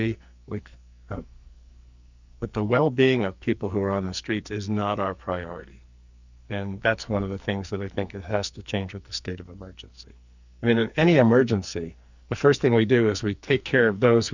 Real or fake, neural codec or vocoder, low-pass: fake; codec, 44.1 kHz, 2.6 kbps, SNAC; 7.2 kHz